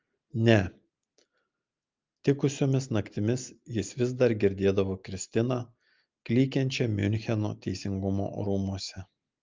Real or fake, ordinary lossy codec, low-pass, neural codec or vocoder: real; Opus, 24 kbps; 7.2 kHz; none